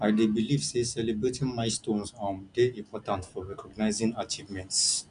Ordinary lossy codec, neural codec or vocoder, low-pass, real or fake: none; none; 9.9 kHz; real